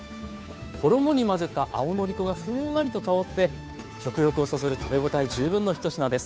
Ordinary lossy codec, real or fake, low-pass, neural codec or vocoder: none; fake; none; codec, 16 kHz, 2 kbps, FunCodec, trained on Chinese and English, 25 frames a second